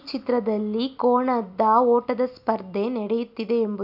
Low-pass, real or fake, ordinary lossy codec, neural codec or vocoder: 5.4 kHz; real; none; none